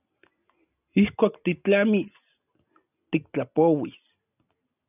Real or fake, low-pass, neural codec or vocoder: real; 3.6 kHz; none